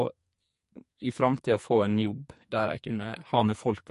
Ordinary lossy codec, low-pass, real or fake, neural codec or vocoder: MP3, 48 kbps; 14.4 kHz; fake; codec, 44.1 kHz, 2.6 kbps, SNAC